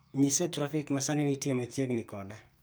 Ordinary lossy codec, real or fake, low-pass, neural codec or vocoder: none; fake; none; codec, 44.1 kHz, 2.6 kbps, SNAC